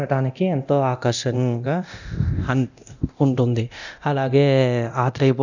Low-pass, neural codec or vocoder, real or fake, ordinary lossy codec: 7.2 kHz; codec, 24 kHz, 0.9 kbps, DualCodec; fake; none